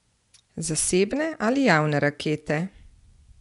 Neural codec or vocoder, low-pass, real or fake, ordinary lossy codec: vocoder, 24 kHz, 100 mel bands, Vocos; 10.8 kHz; fake; none